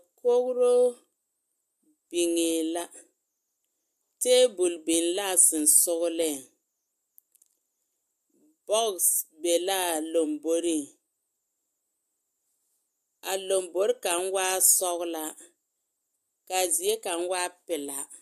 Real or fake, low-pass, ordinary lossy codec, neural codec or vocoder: real; 14.4 kHz; AAC, 96 kbps; none